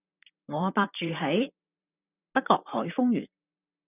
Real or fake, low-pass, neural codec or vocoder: fake; 3.6 kHz; vocoder, 44.1 kHz, 128 mel bands, Pupu-Vocoder